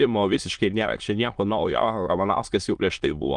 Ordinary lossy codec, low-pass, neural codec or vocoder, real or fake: Opus, 24 kbps; 9.9 kHz; autoencoder, 22.05 kHz, a latent of 192 numbers a frame, VITS, trained on many speakers; fake